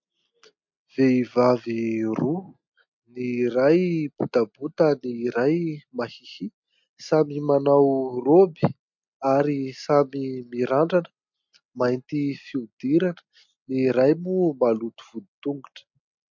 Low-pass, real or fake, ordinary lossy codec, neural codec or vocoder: 7.2 kHz; real; MP3, 48 kbps; none